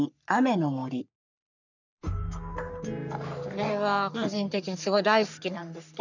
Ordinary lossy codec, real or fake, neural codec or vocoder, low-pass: none; fake; codec, 44.1 kHz, 3.4 kbps, Pupu-Codec; 7.2 kHz